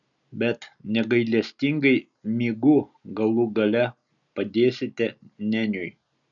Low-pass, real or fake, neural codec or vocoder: 7.2 kHz; real; none